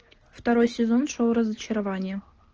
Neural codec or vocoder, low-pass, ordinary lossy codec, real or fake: none; 7.2 kHz; Opus, 16 kbps; real